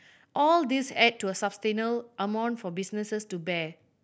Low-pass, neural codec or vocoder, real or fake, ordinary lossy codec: none; none; real; none